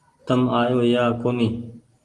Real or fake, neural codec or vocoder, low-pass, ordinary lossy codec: real; none; 10.8 kHz; Opus, 24 kbps